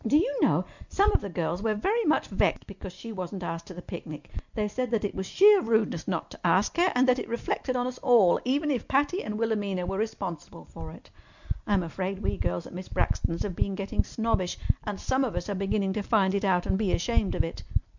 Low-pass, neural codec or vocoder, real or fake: 7.2 kHz; none; real